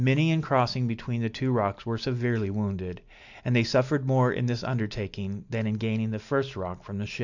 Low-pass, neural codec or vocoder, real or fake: 7.2 kHz; none; real